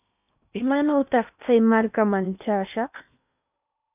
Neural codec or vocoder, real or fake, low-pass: codec, 16 kHz in and 24 kHz out, 0.8 kbps, FocalCodec, streaming, 65536 codes; fake; 3.6 kHz